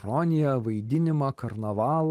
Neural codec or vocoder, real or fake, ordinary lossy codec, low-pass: vocoder, 44.1 kHz, 128 mel bands every 512 samples, BigVGAN v2; fake; Opus, 24 kbps; 14.4 kHz